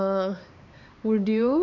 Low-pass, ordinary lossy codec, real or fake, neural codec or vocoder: 7.2 kHz; none; fake; codec, 16 kHz, 4 kbps, X-Codec, HuBERT features, trained on LibriSpeech